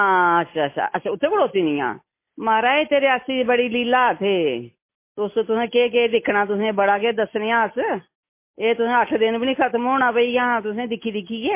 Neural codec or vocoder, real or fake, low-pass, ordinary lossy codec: none; real; 3.6 kHz; MP3, 24 kbps